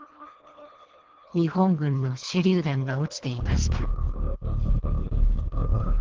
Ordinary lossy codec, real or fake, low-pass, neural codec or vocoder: Opus, 16 kbps; fake; 7.2 kHz; codec, 24 kHz, 1.5 kbps, HILCodec